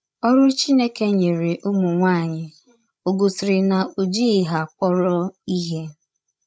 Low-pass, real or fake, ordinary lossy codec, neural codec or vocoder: none; fake; none; codec, 16 kHz, 16 kbps, FreqCodec, larger model